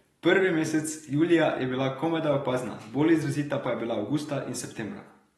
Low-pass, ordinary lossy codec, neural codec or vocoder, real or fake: 19.8 kHz; AAC, 32 kbps; none; real